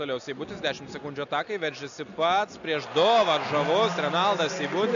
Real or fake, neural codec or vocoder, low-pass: real; none; 7.2 kHz